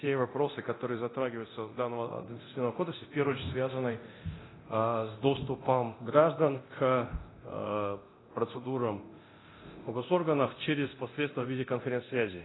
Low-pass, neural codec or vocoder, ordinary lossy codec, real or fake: 7.2 kHz; codec, 24 kHz, 0.9 kbps, DualCodec; AAC, 16 kbps; fake